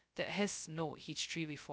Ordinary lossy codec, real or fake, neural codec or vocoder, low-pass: none; fake; codec, 16 kHz, 0.2 kbps, FocalCodec; none